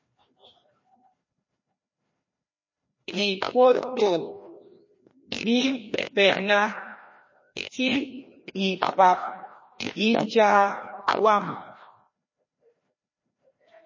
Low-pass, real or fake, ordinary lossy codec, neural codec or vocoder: 7.2 kHz; fake; MP3, 32 kbps; codec, 16 kHz, 1 kbps, FreqCodec, larger model